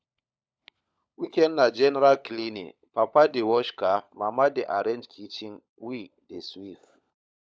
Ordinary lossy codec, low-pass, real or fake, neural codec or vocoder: none; none; fake; codec, 16 kHz, 16 kbps, FunCodec, trained on LibriTTS, 50 frames a second